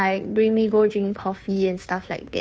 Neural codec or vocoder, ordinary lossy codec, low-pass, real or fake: codec, 16 kHz, 2 kbps, FunCodec, trained on Chinese and English, 25 frames a second; none; none; fake